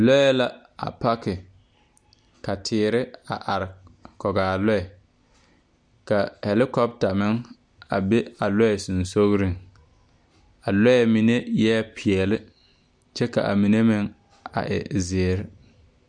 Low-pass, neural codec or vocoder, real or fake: 9.9 kHz; none; real